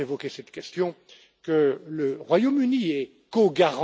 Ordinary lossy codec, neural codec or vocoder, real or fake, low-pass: none; none; real; none